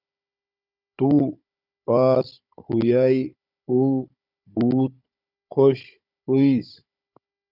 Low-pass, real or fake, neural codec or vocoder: 5.4 kHz; fake; codec, 16 kHz, 16 kbps, FunCodec, trained on Chinese and English, 50 frames a second